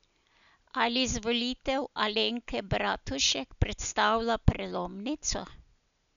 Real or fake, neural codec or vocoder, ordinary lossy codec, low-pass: real; none; none; 7.2 kHz